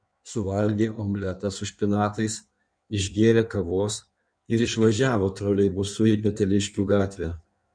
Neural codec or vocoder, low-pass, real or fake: codec, 16 kHz in and 24 kHz out, 1.1 kbps, FireRedTTS-2 codec; 9.9 kHz; fake